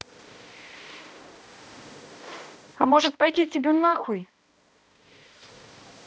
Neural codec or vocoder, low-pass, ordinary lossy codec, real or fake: codec, 16 kHz, 1 kbps, X-Codec, HuBERT features, trained on balanced general audio; none; none; fake